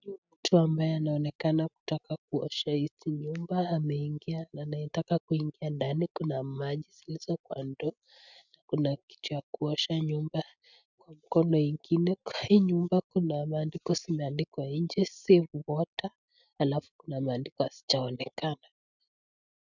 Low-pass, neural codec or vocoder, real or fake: 7.2 kHz; none; real